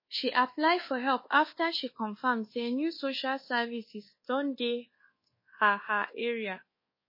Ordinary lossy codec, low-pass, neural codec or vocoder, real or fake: MP3, 24 kbps; 5.4 kHz; codec, 24 kHz, 1.2 kbps, DualCodec; fake